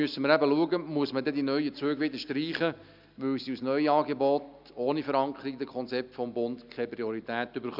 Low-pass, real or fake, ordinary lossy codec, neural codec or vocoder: 5.4 kHz; real; none; none